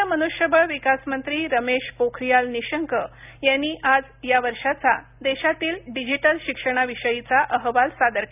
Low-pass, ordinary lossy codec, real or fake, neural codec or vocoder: 3.6 kHz; none; real; none